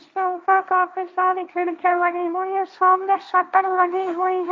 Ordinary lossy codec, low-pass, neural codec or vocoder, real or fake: none; none; codec, 16 kHz, 1.1 kbps, Voila-Tokenizer; fake